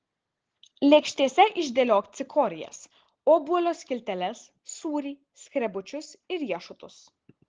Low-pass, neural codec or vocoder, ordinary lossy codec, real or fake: 7.2 kHz; none; Opus, 16 kbps; real